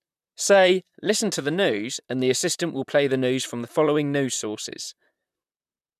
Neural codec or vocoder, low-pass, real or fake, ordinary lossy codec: vocoder, 44.1 kHz, 128 mel bands, Pupu-Vocoder; 14.4 kHz; fake; none